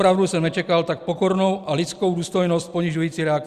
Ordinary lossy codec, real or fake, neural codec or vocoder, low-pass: Opus, 64 kbps; real; none; 14.4 kHz